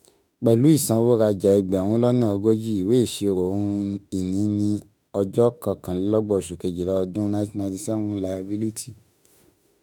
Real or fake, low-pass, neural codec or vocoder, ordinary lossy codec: fake; none; autoencoder, 48 kHz, 32 numbers a frame, DAC-VAE, trained on Japanese speech; none